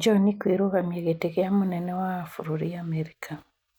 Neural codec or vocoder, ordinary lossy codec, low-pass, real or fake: none; none; 19.8 kHz; real